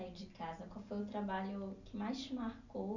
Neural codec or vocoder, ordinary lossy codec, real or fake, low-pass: none; none; real; 7.2 kHz